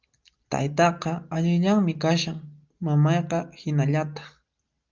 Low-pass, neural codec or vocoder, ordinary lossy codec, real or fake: 7.2 kHz; none; Opus, 24 kbps; real